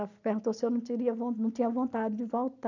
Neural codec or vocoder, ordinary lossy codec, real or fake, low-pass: none; none; real; 7.2 kHz